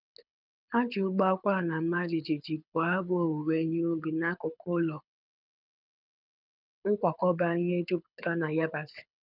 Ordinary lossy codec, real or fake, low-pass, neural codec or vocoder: none; fake; 5.4 kHz; codec, 24 kHz, 6 kbps, HILCodec